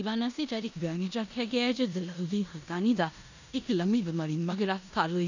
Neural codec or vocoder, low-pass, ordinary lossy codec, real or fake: codec, 16 kHz in and 24 kHz out, 0.9 kbps, LongCat-Audio-Codec, four codebook decoder; 7.2 kHz; none; fake